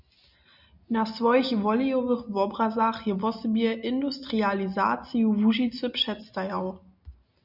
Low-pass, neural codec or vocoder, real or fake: 5.4 kHz; none; real